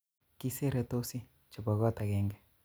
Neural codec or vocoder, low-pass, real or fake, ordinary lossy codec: none; none; real; none